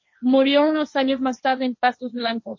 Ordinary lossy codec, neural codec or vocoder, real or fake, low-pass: MP3, 32 kbps; codec, 16 kHz, 1.1 kbps, Voila-Tokenizer; fake; 7.2 kHz